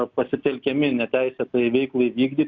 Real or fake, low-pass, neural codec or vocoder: real; 7.2 kHz; none